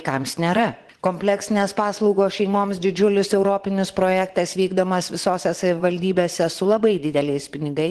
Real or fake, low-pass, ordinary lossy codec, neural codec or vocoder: fake; 10.8 kHz; Opus, 16 kbps; vocoder, 24 kHz, 100 mel bands, Vocos